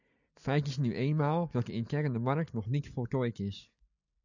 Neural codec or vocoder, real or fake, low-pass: none; real; 7.2 kHz